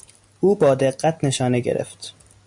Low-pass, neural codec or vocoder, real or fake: 10.8 kHz; none; real